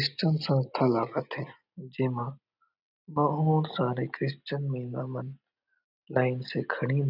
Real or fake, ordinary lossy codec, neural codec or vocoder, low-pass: real; none; none; 5.4 kHz